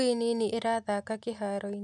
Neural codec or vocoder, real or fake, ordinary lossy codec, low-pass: none; real; MP3, 96 kbps; 10.8 kHz